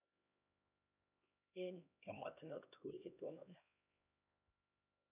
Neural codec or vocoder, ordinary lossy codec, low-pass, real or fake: codec, 16 kHz, 1 kbps, X-Codec, HuBERT features, trained on LibriSpeech; none; 3.6 kHz; fake